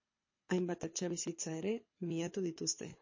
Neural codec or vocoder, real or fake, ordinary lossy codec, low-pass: codec, 24 kHz, 6 kbps, HILCodec; fake; MP3, 32 kbps; 7.2 kHz